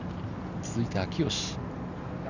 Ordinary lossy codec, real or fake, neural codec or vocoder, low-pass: none; real; none; 7.2 kHz